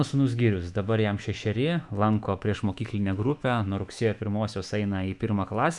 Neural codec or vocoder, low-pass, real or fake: autoencoder, 48 kHz, 128 numbers a frame, DAC-VAE, trained on Japanese speech; 10.8 kHz; fake